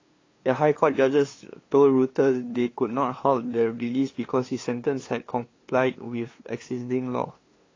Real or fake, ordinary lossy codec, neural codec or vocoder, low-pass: fake; AAC, 32 kbps; codec, 16 kHz, 2 kbps, FunCodec, trained on LibriTTS, 25 frames a second; 7.2 kHz